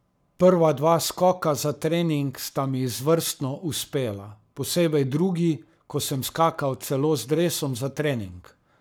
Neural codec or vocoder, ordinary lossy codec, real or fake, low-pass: codec, 44.1 kHz, 7.8 kbps, Pupu-Codec; none; fake; none